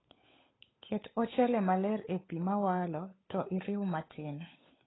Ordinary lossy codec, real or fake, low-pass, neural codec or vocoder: AAC, 16 kbps; fake; 7.2 kHz; codec, 16 kHz, 8 kbps, FunCodec, trained on Chinese and English, 25 frames a second